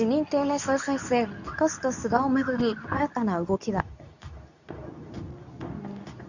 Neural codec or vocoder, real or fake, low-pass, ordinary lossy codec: codec, 24 kHz, 0.9 kbps, WavTokenizer, medium speech release version 2; fake; 7.2 kHz; none